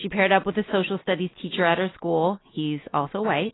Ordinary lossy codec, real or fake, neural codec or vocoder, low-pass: AAC, 16 kbps; real; none; 7.2 kHz